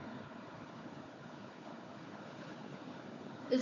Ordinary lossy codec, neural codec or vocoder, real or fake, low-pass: AAC, 32 kbps; codec, 16 kHz, 4 kbps, FunCodec, trained on Chinese and English, 50 frames a second; fake; 7.2 kHz